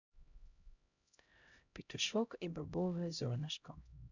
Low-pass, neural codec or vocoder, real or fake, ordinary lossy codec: 7.2 kHz; codec, 16 kHz, 0.5 kbps, X-Codec, HuBERT features, trained on LibriSpeech; fake; none